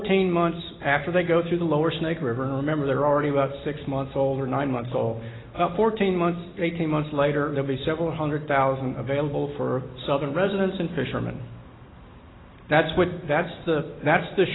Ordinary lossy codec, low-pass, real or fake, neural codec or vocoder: AAC, 16 kbps; 7.2 kHz; real; none